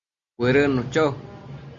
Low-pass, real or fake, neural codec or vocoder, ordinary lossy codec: 7.2 kHz; real; none; Opus, 64 kbps